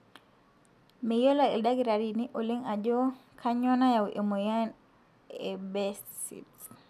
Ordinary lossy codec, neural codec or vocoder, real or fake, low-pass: none; none; real; 14.4 kHz